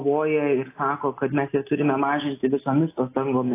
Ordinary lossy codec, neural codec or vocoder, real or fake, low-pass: AAC, 24 kbps; none; real; 3.6 kHz